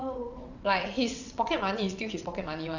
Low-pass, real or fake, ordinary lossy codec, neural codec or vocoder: 7.2 kHz; fake; none; vocoder, 22.05 kHz, 80 mel bands, WaveNeXt